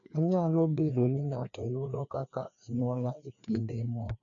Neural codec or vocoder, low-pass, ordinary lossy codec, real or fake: codec, 16 kHz, 2 kbps, FreqCodec, larger model; 7.2 kHz; none; fake